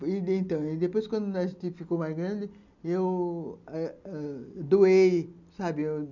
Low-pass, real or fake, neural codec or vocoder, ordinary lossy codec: 7.2 kHz; real; none; none